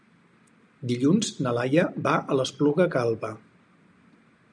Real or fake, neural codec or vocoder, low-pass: real; none; 9.9 kHz